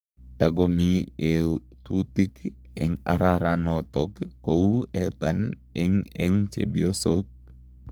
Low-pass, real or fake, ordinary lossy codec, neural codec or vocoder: none; fake; none; codec, 44.1 kHz, 3.4 kbps, Pupu-Codec